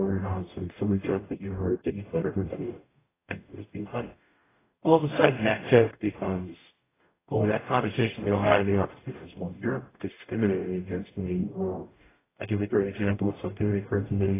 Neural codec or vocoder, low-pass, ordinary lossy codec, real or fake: codec, 44.1 kHz, 0.9 kbps, DAC; 3.6 kHz; AAC, 16 kbps; fake